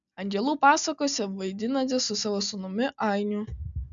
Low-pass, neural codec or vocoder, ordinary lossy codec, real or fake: 7.2 kHz; none; MP3, 96 kbps; real